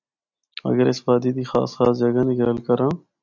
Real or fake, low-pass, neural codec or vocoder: real; 7.2 kHz; none